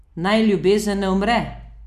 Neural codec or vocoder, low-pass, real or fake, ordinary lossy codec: none; 14.4 kHz; real; none